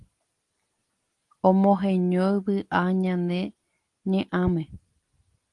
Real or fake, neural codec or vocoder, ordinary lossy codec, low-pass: real; none; Opus, 24 kbps; 10.8 kHz